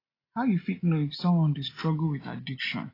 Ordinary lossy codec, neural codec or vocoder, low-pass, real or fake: AAC, 24 kbps; none; 5.4 kHz; real